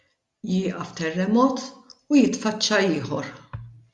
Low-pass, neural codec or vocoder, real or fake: 10.8 kHz; none; real